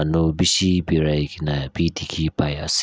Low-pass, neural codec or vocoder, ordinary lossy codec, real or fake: none; none; none; real